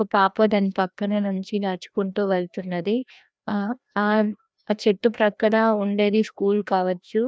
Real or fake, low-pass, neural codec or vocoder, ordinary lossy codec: fake; none; codec, 16 kHz, 1 kbps, FreqCodec, larger model; none